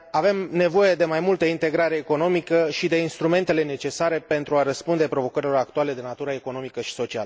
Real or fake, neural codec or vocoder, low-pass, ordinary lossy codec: real; none; none; none